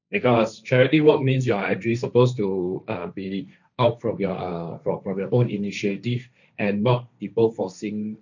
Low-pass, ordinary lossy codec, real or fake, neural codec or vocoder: 7.2 kHz; none; fake; codec, 16 kHz, 1.1 kbps, Voila-Tokenizer